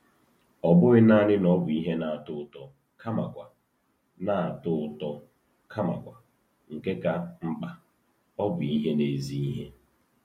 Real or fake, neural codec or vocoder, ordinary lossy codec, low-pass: real; none; MP3, 64 kbps; 19.8 kHz